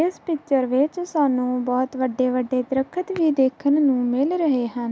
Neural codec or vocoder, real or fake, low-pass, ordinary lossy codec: none; real; none; none